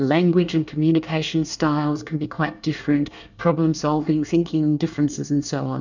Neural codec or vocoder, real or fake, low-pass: codec, 24 kHz, 1 kbps, SNAC; fake; 7.2 kHz